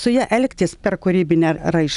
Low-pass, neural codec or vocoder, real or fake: 10.8 kHz; none; real